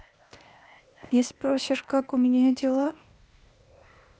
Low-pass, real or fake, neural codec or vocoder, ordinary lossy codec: none; fake; codec, 16 kHz, 0.8 kbps, ZipCodec; none